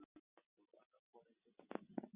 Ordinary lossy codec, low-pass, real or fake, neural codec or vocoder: Opus, 64 kbps; 3.6 kHz; real; none